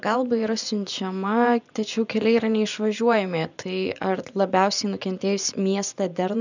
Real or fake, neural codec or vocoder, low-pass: fake; vocoder, 24 kHz, 100 mel bands, Vocos; 7.2 kHz